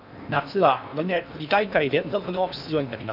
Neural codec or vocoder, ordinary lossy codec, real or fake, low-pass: codec, 16 kHz in and 24 kHz out, 0.8 kbps, FocalCodec, streaming, 65536 codes; none; fake; 5.4 kHz